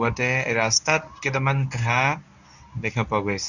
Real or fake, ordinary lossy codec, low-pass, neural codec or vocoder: fake; none; 7.2 kHz; codec, 24 kHz, 0.9 kbps, WavTokenizer, medium speech release version 1